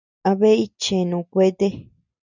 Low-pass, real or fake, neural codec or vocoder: 7.2 kHz; real; none